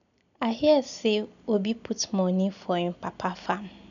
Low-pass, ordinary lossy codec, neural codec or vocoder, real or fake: 7.2 kHz; none; none; real